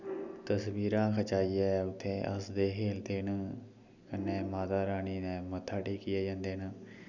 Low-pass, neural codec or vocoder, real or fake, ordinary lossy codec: 7.2 kHz; none; real; none